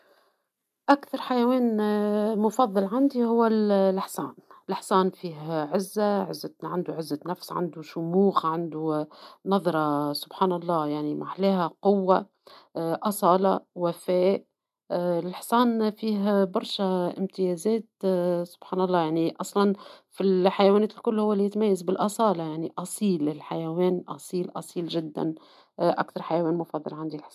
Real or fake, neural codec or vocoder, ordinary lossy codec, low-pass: real; none; none; 14.4 kHz